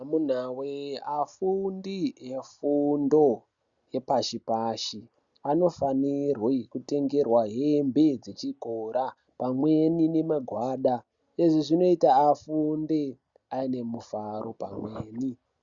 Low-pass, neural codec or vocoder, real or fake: 7.2 kHz; none; real